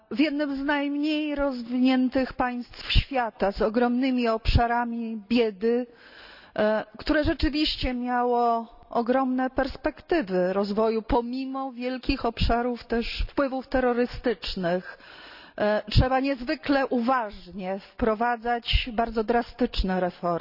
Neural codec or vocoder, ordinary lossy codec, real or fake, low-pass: none; none; real; 5.4 kHz